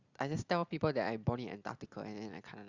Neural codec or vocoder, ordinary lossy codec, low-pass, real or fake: none; Opus, 64 kbps; 7.2 kHz; real